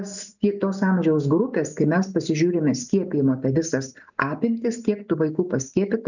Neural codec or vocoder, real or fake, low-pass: none; real; 7.2 kHz